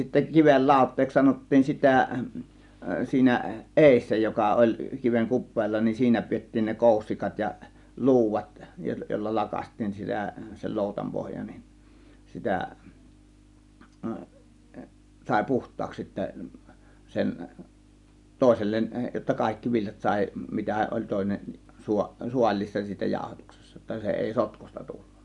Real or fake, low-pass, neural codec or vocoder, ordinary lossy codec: real; 10.8 kHz; none; none